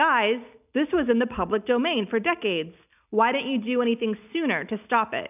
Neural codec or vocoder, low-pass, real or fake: none; 3.6 kHz; real